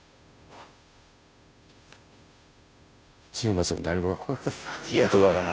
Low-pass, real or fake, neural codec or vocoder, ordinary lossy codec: none; fake; codec, 16 kHz, 0.5 kbps, FunCodec, trained on Chinese and English, 25 frames a second; none